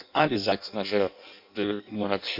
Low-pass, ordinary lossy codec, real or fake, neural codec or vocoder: 5.4 kHz; MP3, 48 kbps; fake; codec, 16 kHz in and 24 kHz out, 0.6 kbps, FireRedTTS-2 codec